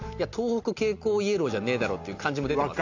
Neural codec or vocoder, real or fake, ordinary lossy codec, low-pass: none; real; AAC, 48 kbps; 7.2 kHz